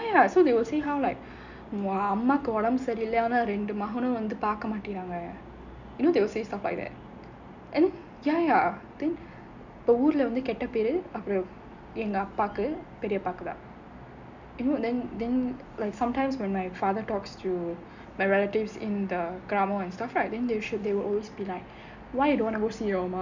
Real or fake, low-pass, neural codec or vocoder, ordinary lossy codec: real; 7.2 kHz; none; none